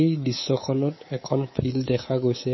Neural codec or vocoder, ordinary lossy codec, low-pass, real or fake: codec, 24 kHz, 3.1 kbps, DualCodec; MP3, 24 kbps; 7.2 kHz; fake